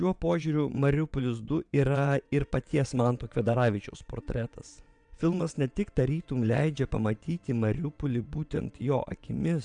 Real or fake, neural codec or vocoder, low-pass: fake; vocoder, 22.05 kHz, 80 mel bands, WaveNeXt; 9.9 kHz